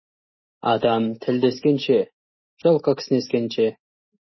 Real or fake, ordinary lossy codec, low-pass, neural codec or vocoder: real; MP3, 24 kbps; 7.2 kHz; none